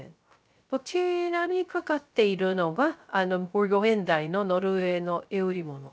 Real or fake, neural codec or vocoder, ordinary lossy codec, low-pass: fake; codec, 16 kHz, 0.3 kbps, FocalCodec; none; none